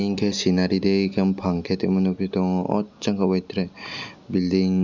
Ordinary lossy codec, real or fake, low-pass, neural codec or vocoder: none; real; 7.2 kHz; none